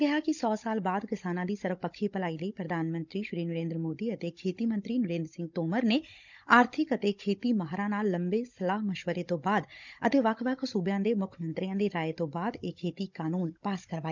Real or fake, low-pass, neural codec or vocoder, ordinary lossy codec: fake; 7.2 kHz; codec, 16 kHz, 16 kbps, FunCodec, trained on Chinese and English, 50 frames a second; none